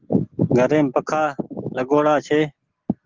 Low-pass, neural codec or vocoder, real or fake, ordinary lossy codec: 7.2 kHz; none; real; Opus, 16 kbps